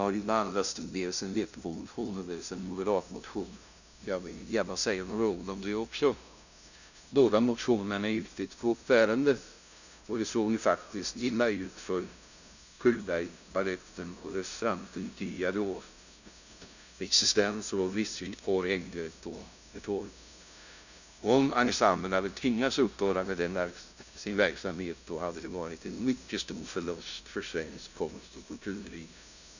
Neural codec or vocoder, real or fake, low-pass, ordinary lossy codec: codec, 16 kHz, 0.5 kbps, FunCodec, trained on LibriTTS, 25 frames a second; fake; 7.2 kHz; none